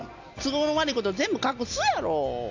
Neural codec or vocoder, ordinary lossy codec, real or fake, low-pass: none; AAC, 48 kbps; real; 7.2 kHz